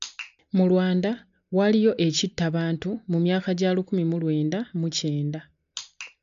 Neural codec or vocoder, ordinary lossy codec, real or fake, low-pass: none; MP3, 64 kbps; real; 7.2 kHz